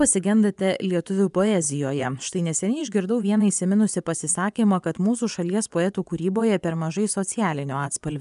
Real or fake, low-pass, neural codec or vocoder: fake; 10.8 kHz; vocoder, 24 kHz, 100 mel bands, Vocos